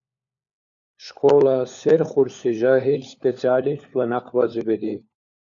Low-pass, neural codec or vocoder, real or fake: 7.2 kHz; codec, 16 kHz, 4 kbps, FunCodec, trained on LibriTTS, 50 frames a second; fake